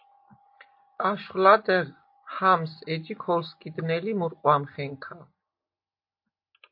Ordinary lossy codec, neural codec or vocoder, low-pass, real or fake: MP3, 32 kbps; none; 5.4 kHz; real